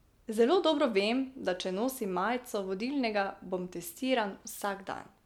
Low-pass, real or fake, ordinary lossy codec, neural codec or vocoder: 19.8 kHz; real; MP3, 96 kbps; none